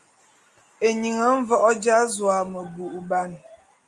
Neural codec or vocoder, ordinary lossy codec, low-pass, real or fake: none; Opus, 32 kbps; 10.8 kHz; real